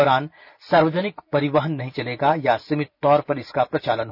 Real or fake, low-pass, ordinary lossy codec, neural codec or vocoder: real; 5.4 kHz; none; none